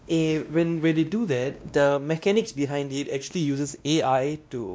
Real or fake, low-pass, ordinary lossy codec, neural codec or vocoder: fake; none; none; codec, 16 kHz, 1 kbps, X-Codec, WavLM features, trained on Multilingual LibriSpeech